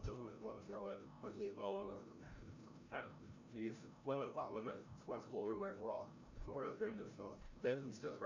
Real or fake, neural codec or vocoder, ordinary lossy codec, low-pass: fake; codec, 16 kHz, 0.5 kbps, FreqCodec, larger model; none; 7.2 kHz